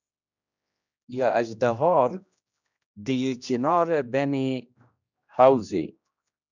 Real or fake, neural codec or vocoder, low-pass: fake; codec, 16 kHz, 1 kbps, X-Codec, HuBERT features, trained on general audio; 7.2 kHz